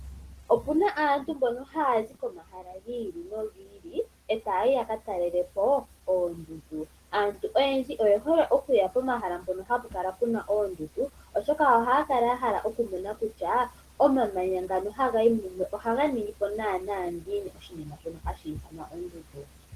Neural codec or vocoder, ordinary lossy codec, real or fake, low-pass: none; Opus, 16 kbps; real; 14.4 kHz